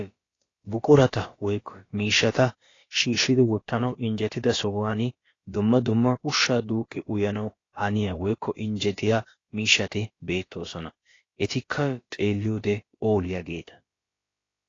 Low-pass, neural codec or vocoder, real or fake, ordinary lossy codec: 7.2 kHz; codec, 16 kHz, about 1 kbps, DyCAST, with the encoder's durations; fake; AAC, 32 kbps